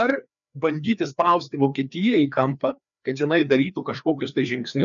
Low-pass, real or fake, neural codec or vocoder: 7.2 kHz; fake; codec, 16 kHz, 2 kbps, FreqCodec, larger model